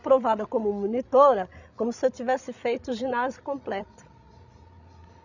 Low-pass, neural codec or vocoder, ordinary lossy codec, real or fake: 7.2 kHz; codec, 16 kHz, 16 kbps, FreqCodec, larger model; none; fake